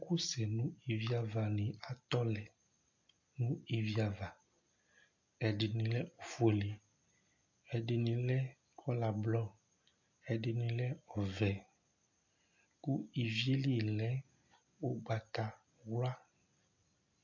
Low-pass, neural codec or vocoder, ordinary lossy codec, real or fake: 7.2 kHz; none; MP3, 48 kbps; real